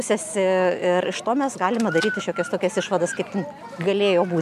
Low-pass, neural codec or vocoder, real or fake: 14.4 kHz; none; real